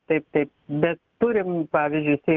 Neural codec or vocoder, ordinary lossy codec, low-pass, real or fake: none; Opus, 24 kbps; 7.2 kHz; real